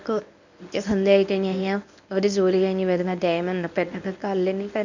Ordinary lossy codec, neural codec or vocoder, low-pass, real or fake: none; codec, 24 kHz, 0.9 kbps, WavTokenizer, medium speech release version 1; 7.2 kHz; fake